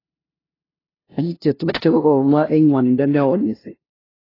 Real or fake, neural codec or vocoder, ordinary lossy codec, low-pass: fake; codec, 16 kHz, 0.5 kbps, FunCodec, trained on LibriTTS, 25 frames a second; AAC, 24 kbps; 5.4 kHz